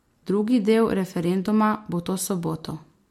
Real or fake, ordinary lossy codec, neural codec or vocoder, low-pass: real; MP3, 64 kbps; none; 19.8 kHz